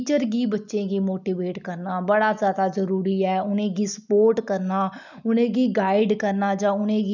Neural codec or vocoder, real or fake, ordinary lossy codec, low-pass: none; real; none; 7.2 kHz